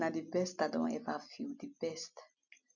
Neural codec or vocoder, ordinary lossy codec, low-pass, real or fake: none; none; 7.2 kHz; real